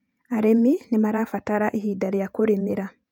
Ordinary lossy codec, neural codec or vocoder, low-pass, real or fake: none; vocoder, 48 kHz, 128 mel bands, Vocos; 19.8 kHz; fake